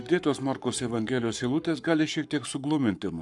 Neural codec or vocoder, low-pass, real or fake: codec, 44.1 kHz, 7.8 kbps, Pupu-Codec; 10.8 kHz; fake